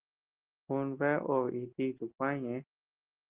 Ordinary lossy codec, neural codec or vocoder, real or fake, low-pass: Opus, 16 kbps; none; real; 3.6 kHz